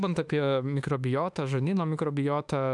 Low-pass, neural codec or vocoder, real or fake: 10.8 kHz; autoencoder, 48 kHz, 32 numbers a frame, DAC-VAE, trained on Japanese speech; fake